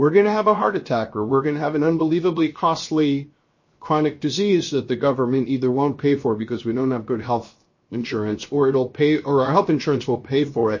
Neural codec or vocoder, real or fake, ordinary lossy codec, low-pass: codec, 16 kHz, 0.7 kbps, FocalCodec; fake; MP3, 32 kbps; 7.2 kHz